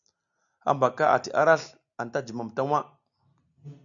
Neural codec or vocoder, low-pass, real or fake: none; 7.2 kHz; real